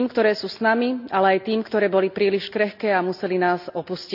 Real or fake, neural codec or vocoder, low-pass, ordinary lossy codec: real; none; 5.4 kHz; none